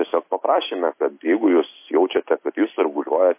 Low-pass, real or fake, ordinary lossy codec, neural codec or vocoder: 3.6 kHz; real; MP3, 24 kbps; none